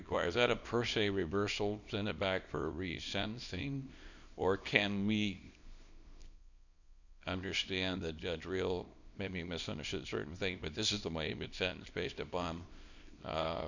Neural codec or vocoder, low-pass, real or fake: codec, 24 kHz, 0.9 kbps, WavTokenizer, small release; 7.2 kHz; fake